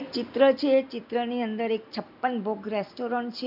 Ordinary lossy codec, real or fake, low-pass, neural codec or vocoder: none; real; 5.4 kHz; none